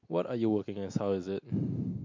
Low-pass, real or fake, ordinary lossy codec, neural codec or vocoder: 7.2 kHz; real; MP3, 48 kbps; none